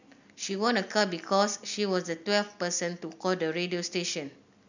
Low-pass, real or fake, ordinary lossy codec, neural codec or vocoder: 7.2 kHz; real; none; none